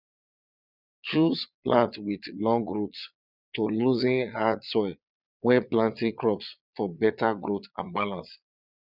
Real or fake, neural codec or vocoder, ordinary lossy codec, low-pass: fake; vocoder, 22.05 kHz, 80 mel bands, Vocos; none; 5.4 kHz